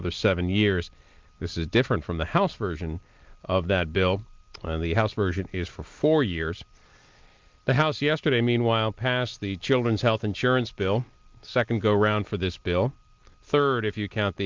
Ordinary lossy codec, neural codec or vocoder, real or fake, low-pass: Opus, 32 kbps; none; real; 7.2 kHz